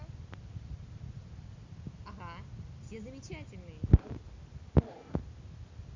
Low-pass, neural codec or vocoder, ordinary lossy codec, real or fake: 7.2 kHz; none; MP3, 64 kbps; real